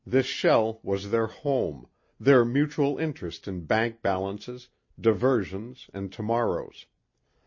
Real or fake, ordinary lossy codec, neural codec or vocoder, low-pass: real; MP3, 32 kbps; none; 7.2 kHz